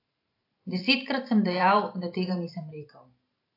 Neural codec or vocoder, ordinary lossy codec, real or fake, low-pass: vocoder, 44.1 kHz, 128 mel bands every 512 samples, BigVGAN v2; AAC, 32 kbps; fake; 5.4 kHz